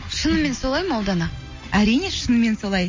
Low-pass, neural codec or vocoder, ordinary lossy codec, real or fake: 7.2 kHz; none; MP3, 32 kbps; real